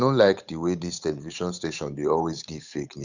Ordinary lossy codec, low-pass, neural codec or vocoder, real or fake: Opus, 64 kbps; 7.2 kHz; codec, 16 kHz, 8 kbps, FunCodec, trained on Chinese and English, 25 frames a second; fake